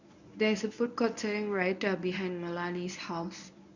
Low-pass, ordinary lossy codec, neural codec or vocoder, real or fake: 7.2 kHz; none; codec, 24 kHz, 0.9 kbps, WavTokenizer, medium speech release version 1; fake